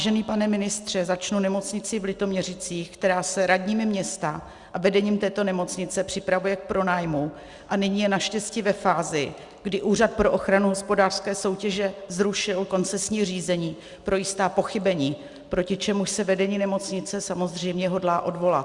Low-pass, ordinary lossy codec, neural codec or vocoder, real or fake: 10.8 kHz; Opus, 64 kbps; none; real